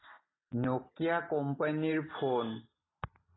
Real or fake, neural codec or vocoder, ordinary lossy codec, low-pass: real; none; AAC, 16 kbps; 7.2 kHz